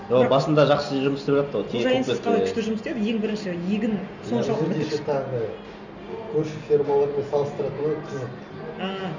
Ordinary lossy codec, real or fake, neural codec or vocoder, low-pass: none; real; none; 7.2 kHz